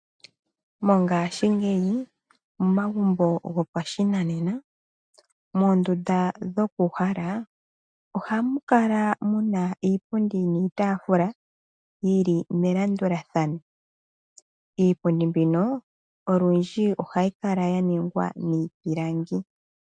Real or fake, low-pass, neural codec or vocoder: real; 9.9 kHz; none